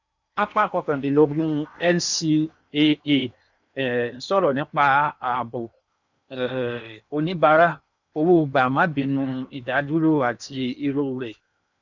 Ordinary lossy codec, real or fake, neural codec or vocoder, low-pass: none; fake; codec, 16 kHz in and 24 kHz out, 0.8 kbps, FocalCodec, streaming, 65536 codes; 7.2 kHz